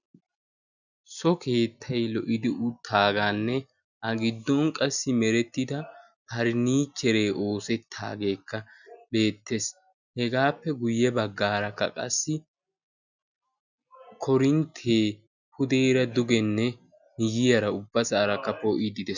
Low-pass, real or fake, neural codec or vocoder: 7.2 kHz; real; none